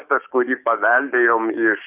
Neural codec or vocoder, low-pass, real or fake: codec, 16 kHz, 6 kbps, DAC; 3.6 kHz; fake